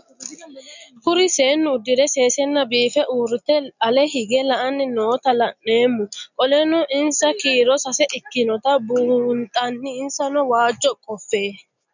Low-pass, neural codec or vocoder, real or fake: 7.2 kHz; none; real